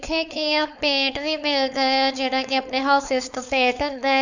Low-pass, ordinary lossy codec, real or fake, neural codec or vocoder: 7.2 kHz; none; fake; codec, 16 kHz, 4.8 kbps, FACodec